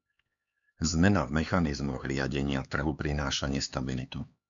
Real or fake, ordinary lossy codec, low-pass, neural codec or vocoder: fake; MP3, 64 kbps; 7.2 kHz; codec, 16 kHz, 4 kbps, X-Codec, HuBERT features, trained on LibriSpeech